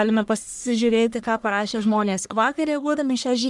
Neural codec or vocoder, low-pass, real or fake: codec, 44.1 kHz, 1.7 kbps, Pupu-Codec; 10.8 kHz; fake